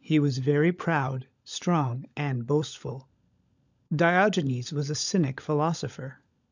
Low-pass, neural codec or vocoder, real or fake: 7.2 kHz; codec, 16 kHz, 16 kbps, FunCodec, trained on LibriTTS, 50 frames a second; fake